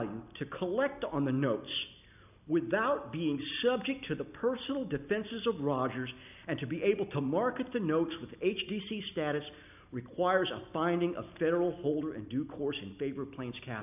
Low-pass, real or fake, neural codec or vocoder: 3.6 kHz; real; none